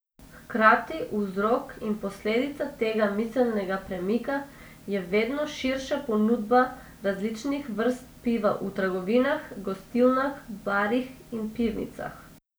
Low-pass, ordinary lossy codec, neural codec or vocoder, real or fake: none; none; none; real